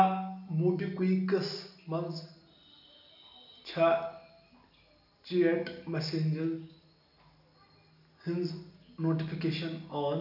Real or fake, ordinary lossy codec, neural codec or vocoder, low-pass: real; none; none; 5.4 kHz